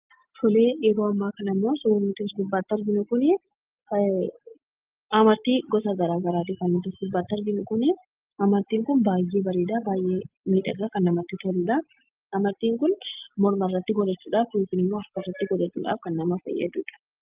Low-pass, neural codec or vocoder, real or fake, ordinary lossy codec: 3.6 kHz; none; real; Opus, 32 kbps